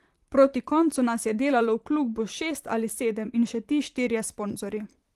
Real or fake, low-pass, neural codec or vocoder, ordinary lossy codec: fake; 14.4 kHz; vocoder, 44.1 kHz, 128 mel bands, Pupu-Vocoder; Opus, 24 kbps